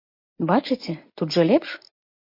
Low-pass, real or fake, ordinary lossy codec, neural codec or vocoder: 5.4 kHz; real; MP3, 32 kbps; none